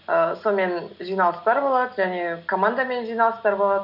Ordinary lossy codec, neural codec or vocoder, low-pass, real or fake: none; none; 5.4 kHz; real